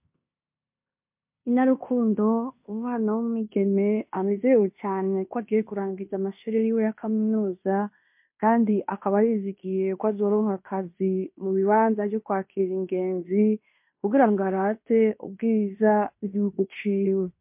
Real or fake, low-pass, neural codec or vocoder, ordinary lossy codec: fake; 3.6 kHz; codec, 16 kHz in and 24 kHz out, 0.9 kbps, LongCat-Audio-Codec, fine tuned four codebook decoder; MP3, 32 kbps